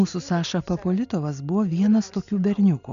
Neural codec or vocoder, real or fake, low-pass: none; real; 7.2 kHz